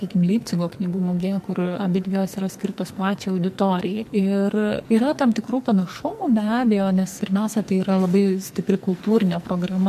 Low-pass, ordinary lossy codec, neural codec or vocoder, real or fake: 14.4 kHz; MP3, 64 kbps; codec, 44.1 kHz, 2.6 kbps, SNAC; fake